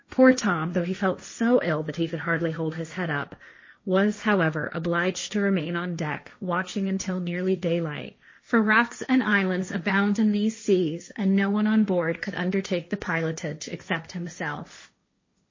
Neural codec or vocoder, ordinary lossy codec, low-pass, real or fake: codec, 16 kHz, 1.1 kbps, Voila-Tokenizer; MP3, 32 kbps; 7.2 kHz; fake